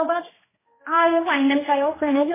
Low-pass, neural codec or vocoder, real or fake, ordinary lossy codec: 3.6 kHz; codec, 16 kHz, 1 kbps, X-Codec, HuBERT features, trained on general audio; fake; MP3, 16 kbps